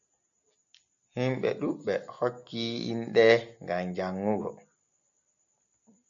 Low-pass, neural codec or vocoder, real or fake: 7.2 kHz; none; real